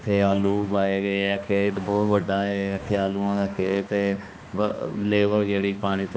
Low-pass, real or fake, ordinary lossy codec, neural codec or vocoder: none; fake; none; codec, 16 kHz, 2 kbps, X-Codec, HuBERT features, trained on balanced general audio